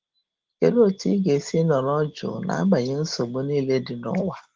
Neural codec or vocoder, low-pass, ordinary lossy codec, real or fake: none; 7.2 kHz; Opus, 16 kbps; real